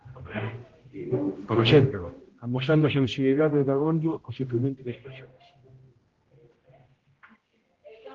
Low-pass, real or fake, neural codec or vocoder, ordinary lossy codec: 7.2 kHz; fake; codec, 16 kHz, 0.5 kbps, X-Codec, HuBERT features, trained on general audio; Opus, 16 kbps